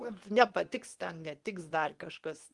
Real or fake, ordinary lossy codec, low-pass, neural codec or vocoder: fake; Opus, 24 kbps; 10.8 kHz; codec, 24 kHz, 0.9 kbps, WavTokenizer, medium speech release version 2